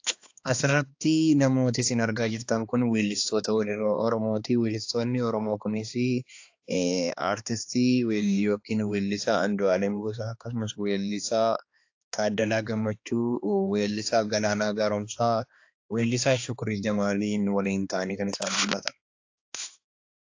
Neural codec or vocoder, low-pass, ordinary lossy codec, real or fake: codec, 16 kHz, 2 kbps, X-Codec, HuBERT features, trained on balanced general audio; 7.2 kHz; AAC, 48 kbps; fake